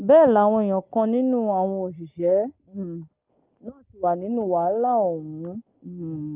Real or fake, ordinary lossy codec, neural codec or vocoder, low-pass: real; Opus, 24 kbps; none; 3.6 kHz